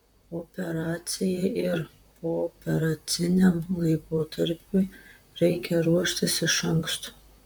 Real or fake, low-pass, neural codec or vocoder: fake; 19.8 kHz; vocoder, 44.1 kHz, 128 mel bands, Pupu-Vocoder